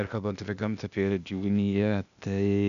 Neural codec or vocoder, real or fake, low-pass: codec, 16 kHz, 0.8 kbps, ZipCodec; fake; 7.2 kHz